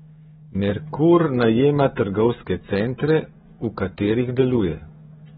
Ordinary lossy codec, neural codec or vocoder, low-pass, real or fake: AAC, 16 kbps; codec, 16 kHz, 16 kbps, FreqCodec, smaller model; 7.2 kHz; fake